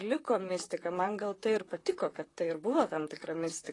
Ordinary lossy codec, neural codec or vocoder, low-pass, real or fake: AAC, 32 kbps; vocoder, 44.1 kHz, 128 mel bands, Pupu-Vocoder; 10.8 kHz; fake